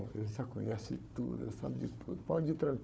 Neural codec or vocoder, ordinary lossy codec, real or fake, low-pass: codec, 16 kHz, 4 kbps, FunCodec, trained on Chinese and English, 50 frames a second; none; fake; none